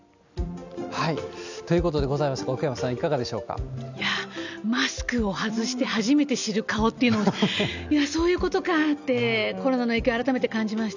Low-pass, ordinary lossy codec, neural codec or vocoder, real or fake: 7.2 kHz; none; none; real